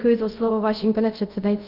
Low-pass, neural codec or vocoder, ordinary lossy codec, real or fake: 5.4 kHz; codec, 24 kHz, 0.5 kbps, DualCodec; Opus, 32 kbps; fake